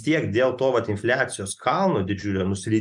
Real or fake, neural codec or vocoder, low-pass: real; none; 10.8 kHz